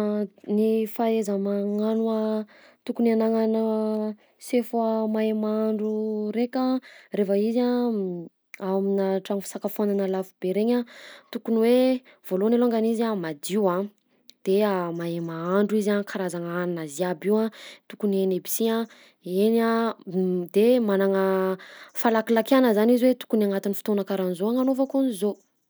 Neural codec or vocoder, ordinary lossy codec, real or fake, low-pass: none; none; real; none